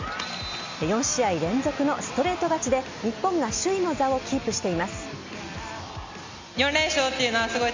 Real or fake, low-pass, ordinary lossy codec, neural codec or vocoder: real; 7.2 kHz; MP3, 48 kbps; none